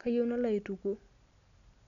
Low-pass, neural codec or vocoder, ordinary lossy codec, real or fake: 7.2 kHz; none; none; real